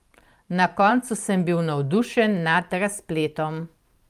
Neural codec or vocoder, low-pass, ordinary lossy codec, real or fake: vocoder, 44.1 kHz, 128 mel bands every 512 samples, BigVGAN v2; 14.4 kHz; Opus, 32 kbps; fake